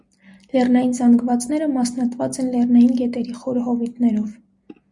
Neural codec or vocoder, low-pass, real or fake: none; 10.8 kHz; real